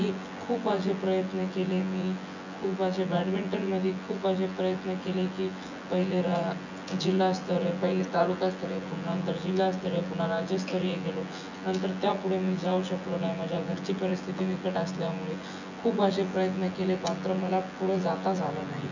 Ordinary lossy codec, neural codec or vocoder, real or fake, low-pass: none; vocoder, 24 kHz, 100 mel bands, Vocos; fake; 7.2 kHz